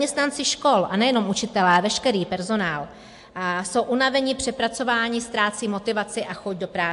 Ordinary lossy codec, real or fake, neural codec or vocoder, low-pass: AAC, 64 kbps; real; none; 10.8 kHz